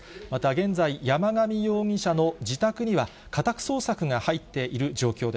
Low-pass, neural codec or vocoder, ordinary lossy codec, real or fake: none; none; none; real